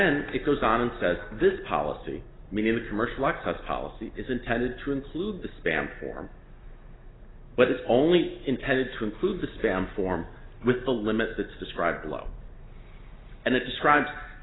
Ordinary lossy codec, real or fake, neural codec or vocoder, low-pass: AAC, 16 kbps; real; none; 7.2 kHz